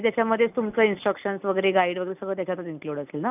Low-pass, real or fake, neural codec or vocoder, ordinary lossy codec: 3.6 kHz; real; none; none